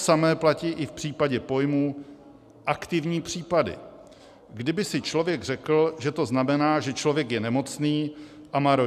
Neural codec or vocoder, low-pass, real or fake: none; 14.4 kHz; real